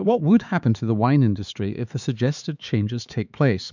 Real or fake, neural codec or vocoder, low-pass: fake; codec, 16 kHz, 4 kbps, X-Codec, HuBERT features, trained on LibriSpeech; 7.2 kHz